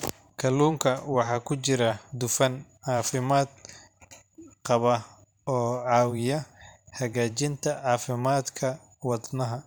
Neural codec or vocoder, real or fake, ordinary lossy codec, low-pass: vocoder, 44.1 kHz, 128 mel bands every 512 samples, BigVGAN v2; fake; none; none